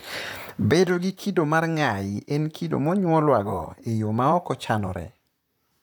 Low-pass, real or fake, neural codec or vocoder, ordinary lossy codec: none; fake; vocoder, 44.1 kHz, 128 mel bands, Pupu-Vocoder; none